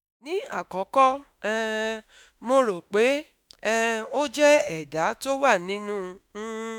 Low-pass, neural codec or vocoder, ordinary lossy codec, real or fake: 19.8 kHz; autoencoder, 48 kHz, 32 numbers a frame, DAC-VAE, trained on Japanese speech; none; fake